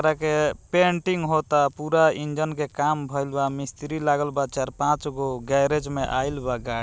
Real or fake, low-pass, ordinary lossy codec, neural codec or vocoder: real; none; none; none